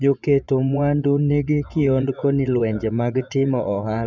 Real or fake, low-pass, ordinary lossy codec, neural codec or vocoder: fake; 7.2 kHz; none; vocoder, 24 kHz, 100 mel bands, Vocos